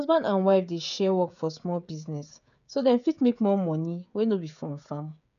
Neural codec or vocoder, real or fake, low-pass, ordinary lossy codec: codec, 16 kHz, 16 kbps, FreqCodec, smaller model; fake; 7.2 kHz; none